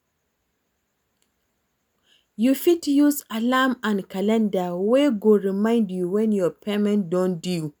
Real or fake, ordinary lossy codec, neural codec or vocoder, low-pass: real; none; none; none